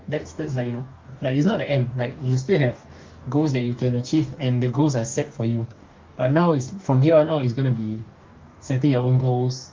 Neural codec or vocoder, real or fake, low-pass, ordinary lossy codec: codec, 44.1 kHz, 2.6 kbps, DAC; fake; 7.2 kHz; Opus, 32 kbps